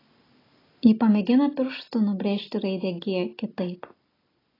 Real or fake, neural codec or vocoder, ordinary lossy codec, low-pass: real; none; AAC, 24 kbps; 5.4 kHz